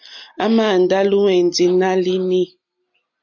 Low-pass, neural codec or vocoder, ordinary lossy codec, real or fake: 7.2 kHz; none; AAC, 48 kbps; real